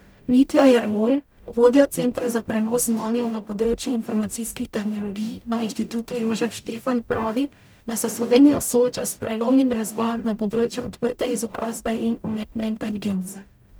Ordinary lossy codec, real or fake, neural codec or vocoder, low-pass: none; fake; codec, 44.1 kHz, 0.9 kbps, DAC; none